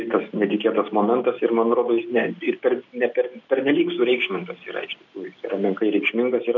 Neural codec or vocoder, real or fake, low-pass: none; real; 7.2 kHz